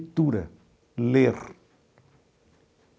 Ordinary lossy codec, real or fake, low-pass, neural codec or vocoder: none; real; none; none